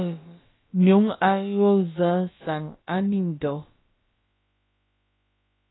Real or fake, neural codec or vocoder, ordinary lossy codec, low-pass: fake; codec, 16 kHz, about 1 kbps, DyCAST, with the encoder's durations; AAC, 16 kbps; 7.2 kHz